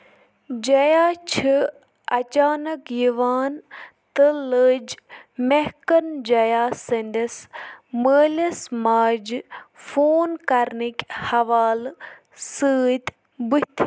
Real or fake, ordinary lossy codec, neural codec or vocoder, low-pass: real; none; none; none